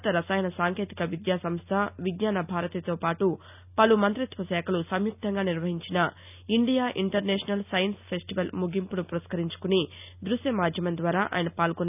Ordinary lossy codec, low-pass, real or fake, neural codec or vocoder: none; 3.6 kHz; real; none